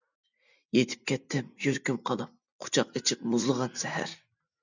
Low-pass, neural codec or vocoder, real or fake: 7.2 kHz; none; real